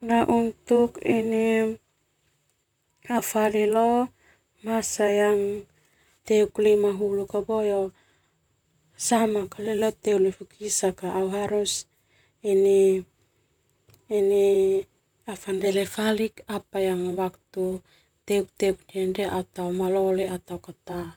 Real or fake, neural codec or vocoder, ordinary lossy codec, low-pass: fake; vocoder, 44.1 kHz, 128 mel bands, Pupu-Vocoder; none; 19.8 kHz